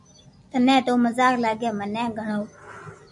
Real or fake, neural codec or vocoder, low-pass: real; none; 10.8 kHz